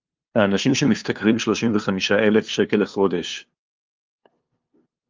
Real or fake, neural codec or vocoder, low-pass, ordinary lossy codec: fake; codec, 16 kHz, 2 kbps, FunCodec, trained on LibriTTS, 25 frames a second; 7.2 kHz; Opus, 24 kbps